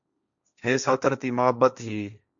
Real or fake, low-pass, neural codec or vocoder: fake; 7.2 kHz; codec, 16 kHz, 1.1 kbps, Voila-Tokenizer